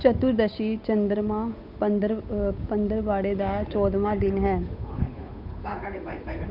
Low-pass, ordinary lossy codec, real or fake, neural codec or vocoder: 5.4 kHz; none; fake; codec, 16 kHz, 8 kbps, FunCodec, trained on Chinese and English, 25 frames a second